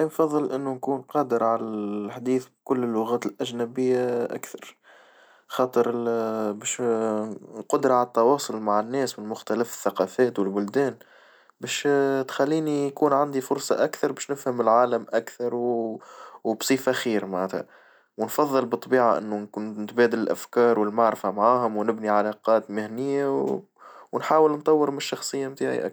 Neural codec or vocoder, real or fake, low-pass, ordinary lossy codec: none; real; none; none